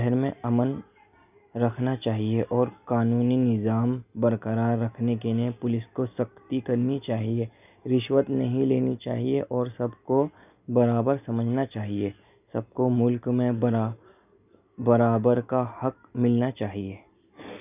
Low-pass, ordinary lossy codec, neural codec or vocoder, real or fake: 3.6 kHz; none; none; real